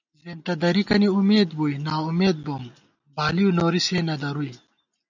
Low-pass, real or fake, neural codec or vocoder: 7.2 kHz; real; none